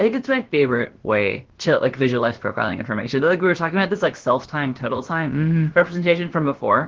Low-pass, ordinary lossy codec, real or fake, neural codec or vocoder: 7.2 kHz; Opus, 16 kbps; fake; codec, 16 kHz, about 1 kbps, DyCAST, with the encoder's durations